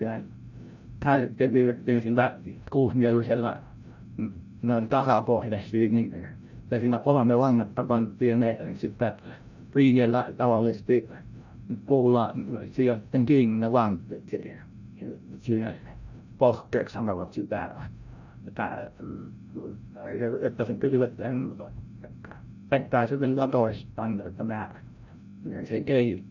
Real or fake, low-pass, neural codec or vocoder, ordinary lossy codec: fake; 7.2 kHz; codec, 16 kHz, 0.5 kbps, FreqCodec, larger model; none